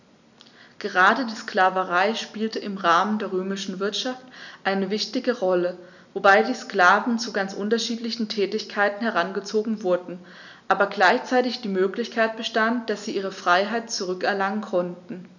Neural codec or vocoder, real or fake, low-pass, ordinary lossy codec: none; real; 7.2 kHz; none